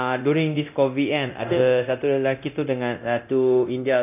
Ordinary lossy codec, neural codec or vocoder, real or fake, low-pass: none; codec, 24 kHz, 0.9 kbps, DualCodec; fake; 3.6 kHz